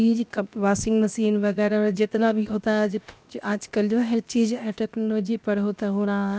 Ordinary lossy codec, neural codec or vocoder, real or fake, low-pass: none; codec, 16 kHz, 0.7 kbps, FocalCodec; fake; none